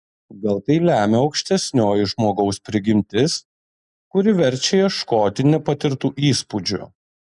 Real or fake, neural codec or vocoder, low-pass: real; none; 10.8 kHz